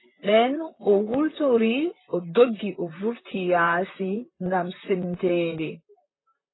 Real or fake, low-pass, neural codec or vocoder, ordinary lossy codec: fake; 7.2 kHz; vocoder, 44.1 kHz, 128 mel bands, Pupu-Vocoder; AAC, 16 kbps